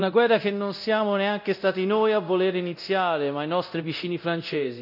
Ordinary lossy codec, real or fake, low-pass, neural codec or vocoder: none; fake; 5.4 kHz; codec, 24 kHz, 0.9 kbps, DualCodec